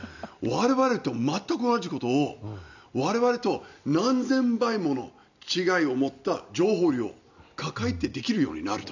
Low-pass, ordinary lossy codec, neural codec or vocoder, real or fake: 7.2 kHz; none; none; real